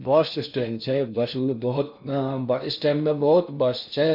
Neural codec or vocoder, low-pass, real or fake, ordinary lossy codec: codec, 16 kHz in and 24 kHz out, 0.6 kbps, FocalCodec, streaming, 4096 codes; 5.4 kHz; fake; none